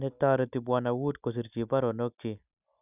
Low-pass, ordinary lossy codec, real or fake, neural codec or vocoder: 3.6 kHz; none; real; none